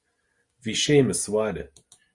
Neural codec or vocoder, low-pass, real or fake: none; 10.8 kHz; real